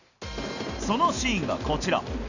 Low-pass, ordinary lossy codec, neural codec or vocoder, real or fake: 7.2 kHz; none; none; real